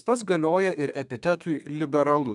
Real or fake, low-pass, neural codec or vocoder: fake; 10.8 kHz; codec, 32 kHz, 1.9 kbps, SNAC